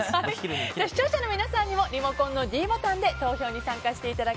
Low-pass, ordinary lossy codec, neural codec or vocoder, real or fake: none; none; none; real